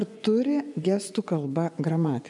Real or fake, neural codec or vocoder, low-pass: fake; vocoder, 24 kHz, 100 mel bands, Vocos; 10.8 kHz